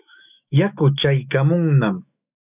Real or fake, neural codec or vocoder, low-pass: fake; autoencoder, 48 kHz, 128 numbers a frame, DAC-VAE, trained on Japanese speech; 3.6 kHz